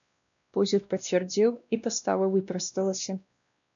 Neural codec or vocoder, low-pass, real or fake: codec, 16 kHz, 1 kbps, X-Codec, WavLM features, trained on Multilingual LibriSpeech; 7.2 kHz; fake